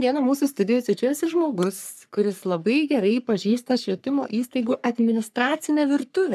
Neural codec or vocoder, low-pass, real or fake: codec, 44.1 kHz, 3.4 kbps, Pupu-Codec; 14.4 kHz; fake